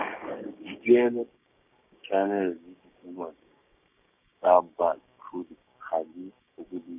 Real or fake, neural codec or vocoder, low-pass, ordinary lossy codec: fake; codec, 16 kHz, 6 kbps, DAC; 3.6 kHz; none